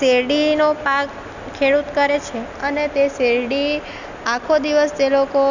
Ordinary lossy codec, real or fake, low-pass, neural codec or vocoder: none; real; 7.2 kHz; none